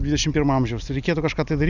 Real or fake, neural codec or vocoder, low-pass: real; none; 7.2 kHz